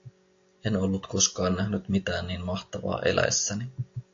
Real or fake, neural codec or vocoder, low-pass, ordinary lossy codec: real; none; 7.2 kHz; AAC, 48 kbps